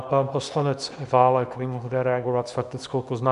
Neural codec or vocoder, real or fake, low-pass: codec, 24 kHz, 0.9 kbps, WavTokenizer, small release; fake; 10.8 kHz